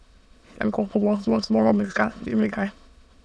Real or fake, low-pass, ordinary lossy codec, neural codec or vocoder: fake; none; none; autoencoder, 22.05 kHz, a latent of 192 numbers a frame, VITS, trained on many speakers